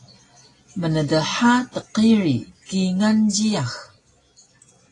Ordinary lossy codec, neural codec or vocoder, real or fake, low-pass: AAC, 32 kbps; none; real; 10.8 kHz